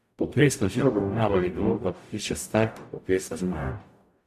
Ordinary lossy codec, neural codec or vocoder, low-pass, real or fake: AAC, 96 kbps; codec, 44.1 kHz, 0.9 kbps, DAC; 14.4 kHz; fake